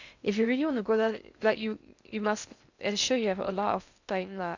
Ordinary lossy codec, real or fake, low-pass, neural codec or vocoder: none; fake; 7.2 kHz; codec, 16 kHz in and 24 kHz out, 0.6 kbps, FocalCodec, streaming, 2048 codes